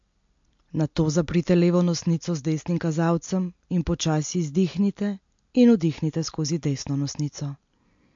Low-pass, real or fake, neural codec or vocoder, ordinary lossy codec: 7.2 kHz; real; none; MP3, 48 kbps